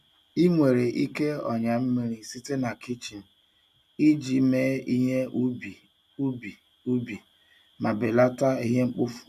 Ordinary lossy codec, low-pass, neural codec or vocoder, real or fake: none; 14.4 kHz; none; real